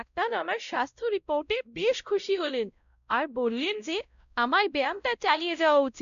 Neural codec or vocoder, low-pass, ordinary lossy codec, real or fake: codec, 16 kHz, 0.5 kbps, X-Codec, WavLM features, trained on Multilingual LibriSpeech; 7.2 kHz; MP3, 96 kbps; fake